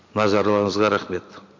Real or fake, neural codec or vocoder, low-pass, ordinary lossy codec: real; none; 7.2 kHz; MP3, 64 kbps